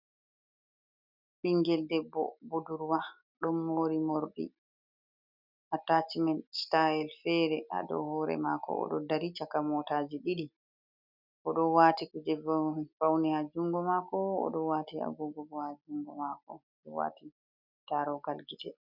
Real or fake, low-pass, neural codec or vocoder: real; 5.4 kHz; none